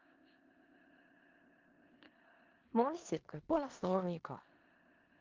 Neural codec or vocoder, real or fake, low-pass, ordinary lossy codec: codec, 16 kHz in and 24 kHz out, 0.4 kbps, LongCat-Audio-Codec, four codebook decoder; fake; 7.2 kHz; Opus, 32 kbps